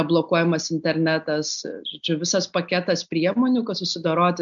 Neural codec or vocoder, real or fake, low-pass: none; real; 7.2 kHz